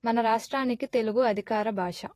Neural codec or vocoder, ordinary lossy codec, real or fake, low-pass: vocoder, 48 kHz, 128 mel bands, Vocos; AAC, 48 kbps; fake; 14.4 kHz